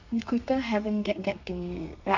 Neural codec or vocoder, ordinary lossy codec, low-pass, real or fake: codec, 32 kHz, 1.9 kbps, SNAC; none; 7.2 kHz; fake